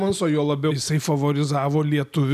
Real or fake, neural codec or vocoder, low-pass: real; none; 14.4 kHz